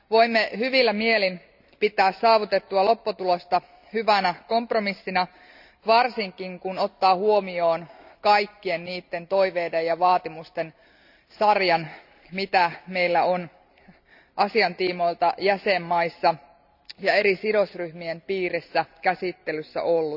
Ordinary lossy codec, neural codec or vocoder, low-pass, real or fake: none; none; 5.4 kHz; real